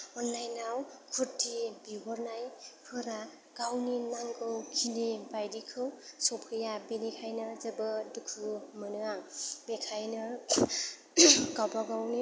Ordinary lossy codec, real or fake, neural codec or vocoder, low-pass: none; real; none; none